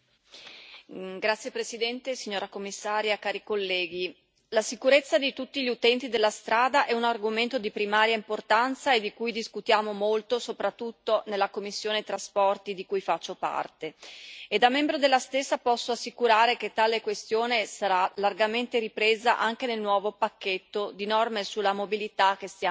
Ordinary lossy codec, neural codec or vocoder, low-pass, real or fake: none; none; none; real